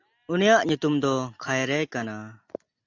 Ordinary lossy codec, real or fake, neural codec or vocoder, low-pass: AAC, 48 kbps; real; none; 7.2 kHz